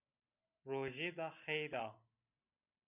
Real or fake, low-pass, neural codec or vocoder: real; 3.6 kHz; none